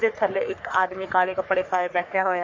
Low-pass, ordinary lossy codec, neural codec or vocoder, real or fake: 7.2 kHz; MP3, 64 kbps; codec, 44.1 kHz, 3.4 kbps, Pupu-Codec; fake